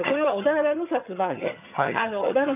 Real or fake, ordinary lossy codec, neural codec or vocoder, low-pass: fake; none; vocoder, 22.05 kHz, 80 mel bands, HiFi-GAN; 3.6 kHz